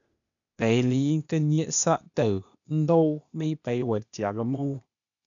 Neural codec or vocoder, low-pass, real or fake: codec, 16 kHz, 0.8 kbps, ZipCodec; 7.2 kHz; fake